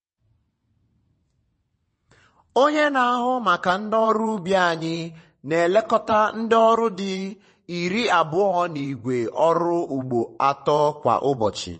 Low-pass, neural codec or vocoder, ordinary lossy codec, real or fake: 9.9 kHz; vocoder, 22.05 kHz, 80 mel bands, WaveNeXt; MP3, 32 kbps; fake